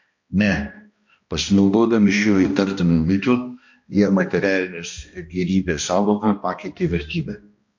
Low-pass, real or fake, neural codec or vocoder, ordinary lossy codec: 7.2 kHz; fake; codec, 16 kHz, 1 kbps, X-Codec, HuBERT features, trained on balanced general audio; MP3, 48 kbps